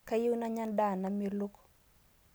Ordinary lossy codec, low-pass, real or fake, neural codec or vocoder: none; none; real; none